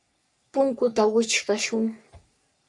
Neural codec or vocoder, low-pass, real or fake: codec, 44.1 kHz, 3.4 kbps, Pupu-Codec; 10.8 kHz; fake